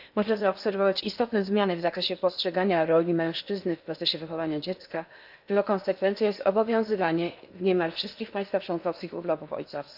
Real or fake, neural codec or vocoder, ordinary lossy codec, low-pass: fake; codec, 16 kHz in and 24 kHz out, 0.8 kbps, FocalCodec, streaming, 65536 codes; none; 5.4 kHz